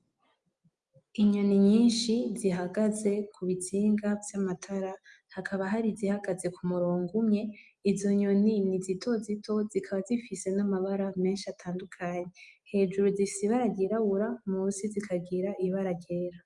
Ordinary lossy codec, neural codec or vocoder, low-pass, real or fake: Opus, 32 kbps; none; 10.8 kHz; real